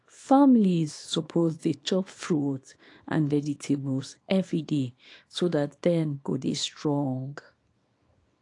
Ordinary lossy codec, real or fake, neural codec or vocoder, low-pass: AAC, 48 kbps; fake; codec, 24 kHz, 0.9 kbps, WavTokenizer, small release; 10.8 kHz